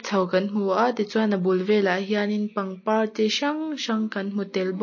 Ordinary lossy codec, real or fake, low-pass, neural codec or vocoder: MP3, 32 kbps; real; 7.2 kHz; none